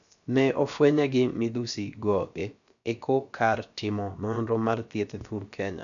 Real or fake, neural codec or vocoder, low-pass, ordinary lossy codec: fake; codec, 16 kHz, about 1 kbps, DyCAST, with the encoder's durations; 7.2 kHz; none